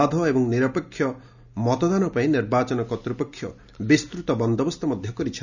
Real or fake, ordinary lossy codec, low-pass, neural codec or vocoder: real; none; 7.2 kHz; none